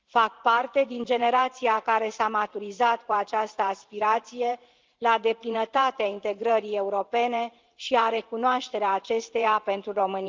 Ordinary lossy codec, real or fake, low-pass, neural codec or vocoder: Opus, 32 kbps; fake; 7.2 kHz; vocoder, 44.1 kHz, 128 mel bands every 512 samples, BigVGAN v2